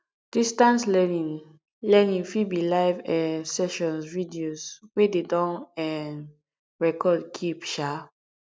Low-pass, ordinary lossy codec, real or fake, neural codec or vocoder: none; none; real; none